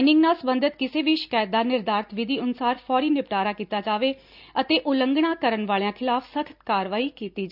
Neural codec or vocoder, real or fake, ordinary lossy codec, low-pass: none; real; none; 5.4 kHz